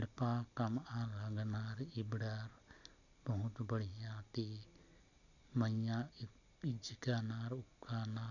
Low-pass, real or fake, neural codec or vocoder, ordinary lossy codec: 7.2 kHz; real; none; none